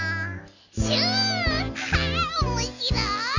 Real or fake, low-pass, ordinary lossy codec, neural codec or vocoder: real; 7.2 kHz; none; none